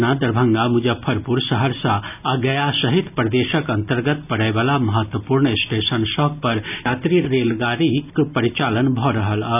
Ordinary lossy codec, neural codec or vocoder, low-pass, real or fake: none; none; 3.6 kHz; real